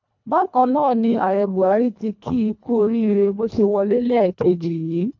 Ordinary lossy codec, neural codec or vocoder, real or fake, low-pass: none; codec, 24 kHz, 1.5 kbps, HILCodec; fake; 7.2 kHz